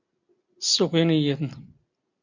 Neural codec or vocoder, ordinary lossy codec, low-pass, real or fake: none; AAC, 48 kbps; 7.2 kHz; real